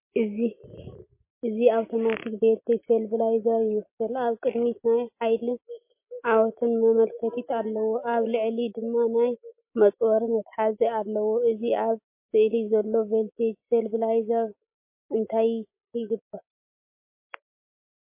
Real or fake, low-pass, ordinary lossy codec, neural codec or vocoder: real; 3.6 kHz; MP3, 24 kbps; none